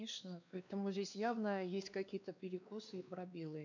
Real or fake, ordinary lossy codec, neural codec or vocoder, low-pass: fake; none; codec, 16 kHz, 2 kbps, X-Codec, WavLM features, trained on Multilingual LibriSpeech; 7.2 kHz